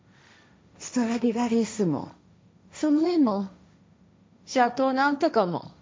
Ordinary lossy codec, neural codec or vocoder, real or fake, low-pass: none; codec, 16 kHz, 1.1 kbps, Voila-Tokenizer; fake; none